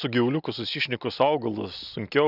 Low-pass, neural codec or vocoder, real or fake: 5.4 kHz; none; real